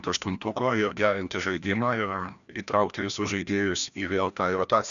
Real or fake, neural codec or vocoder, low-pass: fake; codec, 16 kHz, 1 kbps, FreqCodec, larger model; 7.2 kHz